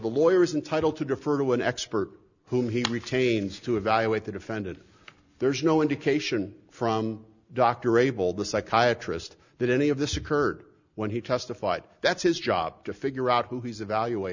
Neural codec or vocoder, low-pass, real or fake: none; 7.2 kHz; real